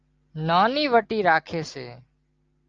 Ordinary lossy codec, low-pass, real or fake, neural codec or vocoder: Opus, 24 kbps; 7.2 kHz; real; none